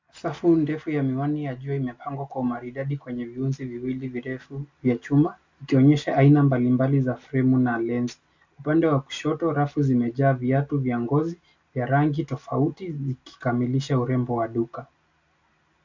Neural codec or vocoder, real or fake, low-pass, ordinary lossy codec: none; real; 7.2 kHz; MP3, 64 kbps